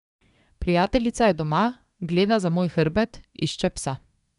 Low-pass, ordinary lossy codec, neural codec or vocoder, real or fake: 10.8 kHz; none; codec, 24 kHz, 1 kbps, SNAC; fake